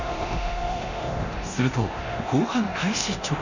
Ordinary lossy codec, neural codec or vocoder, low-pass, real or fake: none; codec, 24 kHz, 0.9 kbps, DualCodec; 7.2 kHz; fake